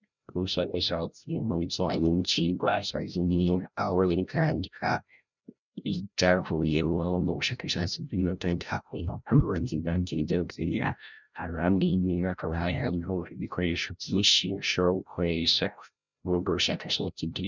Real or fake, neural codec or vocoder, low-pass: fake; codec, 16 kHz, 0.5 kbps, FreqCodec, larger model; 7.2 kHz